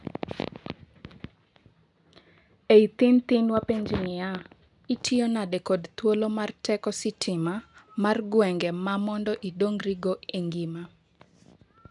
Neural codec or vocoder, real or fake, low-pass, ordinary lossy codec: none; real; 10.8 kHz; none